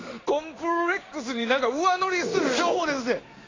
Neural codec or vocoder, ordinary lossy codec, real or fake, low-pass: codec, 16 kHz in and 24 kHz out, 1 kbps, XY-Tokenizer; AAC, 32 kbps; fake; 7.2 kHz